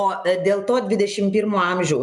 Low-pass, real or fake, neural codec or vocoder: 10.8 kHz; real; none